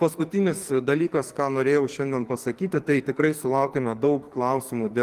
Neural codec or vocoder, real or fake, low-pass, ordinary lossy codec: codec, 44.1 kHz, 2.6 kbps, SNAC; fake; 14.4 kHz; Opus, 24 kbps